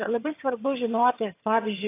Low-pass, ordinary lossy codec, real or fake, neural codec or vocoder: 3.6 kHz; AAC, 24 kbps; fake; vocoder, 22.05 kHz, 80 mel bands, HiFi-GAN